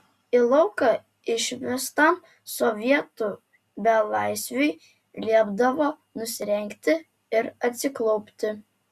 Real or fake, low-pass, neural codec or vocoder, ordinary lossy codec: real; 14.4 kHz; none; Opus, 64 kbps